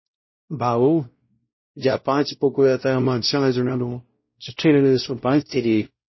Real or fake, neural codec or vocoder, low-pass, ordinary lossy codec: fake; codec, 16 kHz, 0.5 kbps, X-Codec, WavLM features, trained on Multilingual LibriSpeech; 7.2 kHz; MP3, 24 kbps